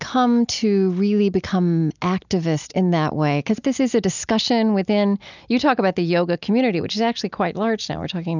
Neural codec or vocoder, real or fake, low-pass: none; real; 7.2 kHz